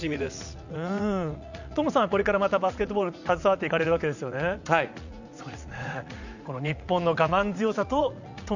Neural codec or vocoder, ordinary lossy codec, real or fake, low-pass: vocoder, 44.1 kHz, 80 mel bands, Vocos; none; fake; 7.2 kHz